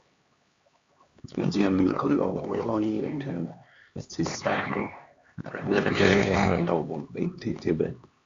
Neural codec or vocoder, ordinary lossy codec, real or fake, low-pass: codec, 16 kHz, 2 kbps, X-Codec, HuBERT features, trained on LibriSpeech; Opus, 64 kbps; fake; 7.2 kHz